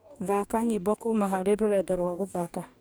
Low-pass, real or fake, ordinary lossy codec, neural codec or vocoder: none; fake; none; codec, 44.1 kHz, 2.6 kbps, DAC